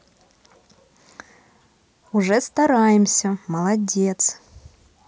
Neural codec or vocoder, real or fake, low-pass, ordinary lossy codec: none; real; none; none